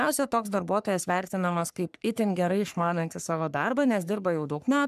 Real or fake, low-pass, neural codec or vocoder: fake; 14.4 kHz; codec, 44.1 kHz, 3.4 kbps, Pupu-Codec